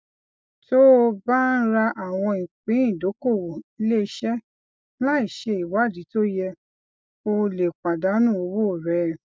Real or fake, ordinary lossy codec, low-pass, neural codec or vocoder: real; none; none; none